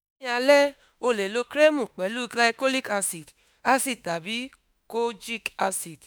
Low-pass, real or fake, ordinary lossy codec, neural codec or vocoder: none; fake; none; autoencoder, 48 kHz, 32 numbers a frame, DAC-VAE, trained on Japanese speech